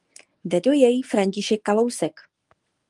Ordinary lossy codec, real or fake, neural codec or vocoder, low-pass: Opus, 32 kbps; fake; codec, 24 kHz, 0.9 kbps, WavTokenizer, medium speech release version 1; 10.8 kHz